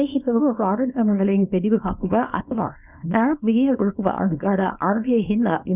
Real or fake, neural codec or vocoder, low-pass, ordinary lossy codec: fake; codec, 24 kHz, 0.9 kbps, WavTokenizer, small release; 3.6 kHz; none